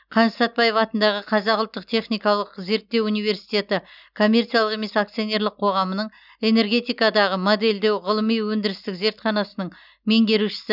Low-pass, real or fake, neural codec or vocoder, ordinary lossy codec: 5.4 kHz; real; none; none